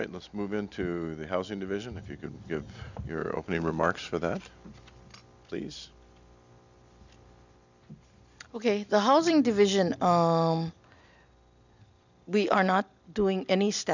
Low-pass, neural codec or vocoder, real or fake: 7.2 kHz; none; real